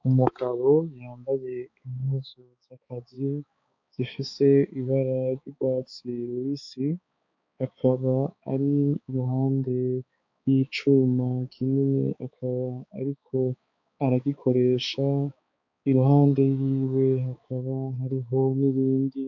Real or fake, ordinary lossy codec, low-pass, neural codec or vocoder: fake; MP3, 48 kbps; 7.2 kHz; codec, 16 kHz, 4 kbps, X-Codec, HuBERT features, trained on balanced general audio